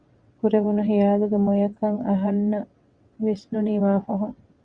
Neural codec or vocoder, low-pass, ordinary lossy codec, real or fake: vocoder, 22.05 kHz, 80 mel bands, WaveNeXt; 9.9 kHz; Opus, 64 kbps; fake